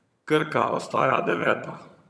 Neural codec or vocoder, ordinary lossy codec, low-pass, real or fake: vocoder, 22.05 kHz, 80 mel bands, HiFi-GAN; none; none; fake